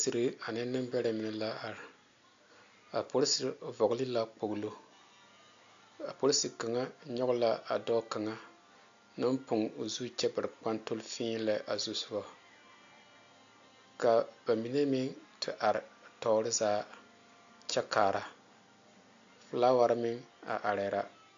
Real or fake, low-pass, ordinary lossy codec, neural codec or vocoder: real; 7.2 kHz; AAC, 64 kbps; none